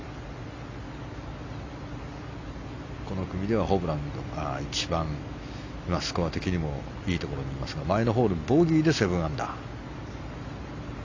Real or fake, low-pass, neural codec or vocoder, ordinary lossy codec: real; 7.2 kHz; none; AAC, 48 kbps